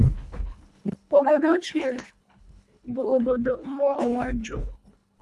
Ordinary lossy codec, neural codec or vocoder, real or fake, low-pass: none; codec, 24 kHz, 1.5 kbps, HILCodec; fake; none